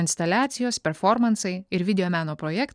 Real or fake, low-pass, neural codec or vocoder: real; 9.9 kHz; none